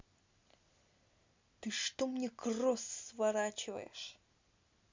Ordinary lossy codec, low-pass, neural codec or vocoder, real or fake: MP3, 64 kbps; 7.2 kHz; none; real